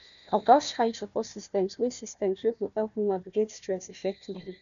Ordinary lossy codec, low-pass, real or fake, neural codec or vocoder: none; 7.2 kHz; fake; codec, 16 kHz, 1 kbps, FunCodec, trained on Chinese and English, 50 frames a second